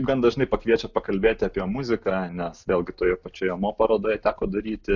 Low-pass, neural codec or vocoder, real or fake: 7.2 kHz; none; real